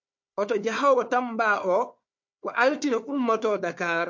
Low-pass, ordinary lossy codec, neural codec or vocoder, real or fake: 7.2 kHz; MP3, 48 kbps; codec, 16 kHz, 4 kbps, FunCodec, trained on Chinese and English, 50 frames a second; fake